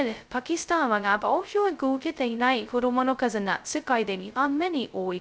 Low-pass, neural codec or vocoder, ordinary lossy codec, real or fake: none; codec, 16 kHz, 0.2 kbps, FocalCodec; none; fake